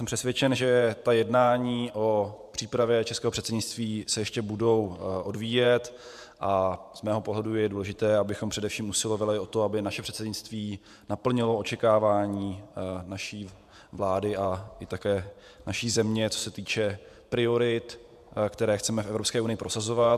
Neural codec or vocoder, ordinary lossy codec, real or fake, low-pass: vocoder, 48 kHz, 128 mel bands, Vocos; MP3, 96 kbps; fake; 14.4 kHz